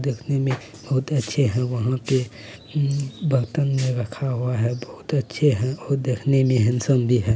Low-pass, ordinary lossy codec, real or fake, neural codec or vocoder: none; none; real; none